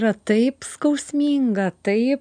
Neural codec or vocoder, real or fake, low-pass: none; real; 9.9 kHz